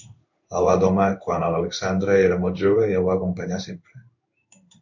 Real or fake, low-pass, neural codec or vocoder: fake; 7.2 kHz; codec, 16 kHz in and 24 kHz out, 1 kbps, XY-Tokenizer